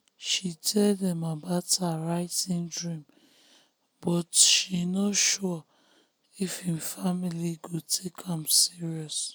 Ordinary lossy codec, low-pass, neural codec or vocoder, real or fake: none; none; none; real